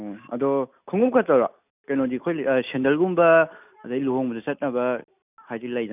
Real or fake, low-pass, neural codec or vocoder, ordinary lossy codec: real; 3.6 kHz; none; none